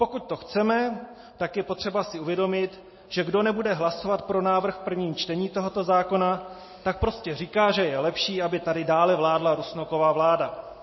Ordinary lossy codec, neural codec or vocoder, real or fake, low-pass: MP3, 24 kbps; none; real; 7.2 kHz